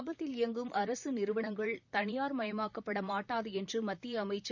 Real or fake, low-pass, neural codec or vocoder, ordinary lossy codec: fake; 7.2 kHz; vocoder, 44.1 kHz, 128 mel bands, Pupu-Vocoder; none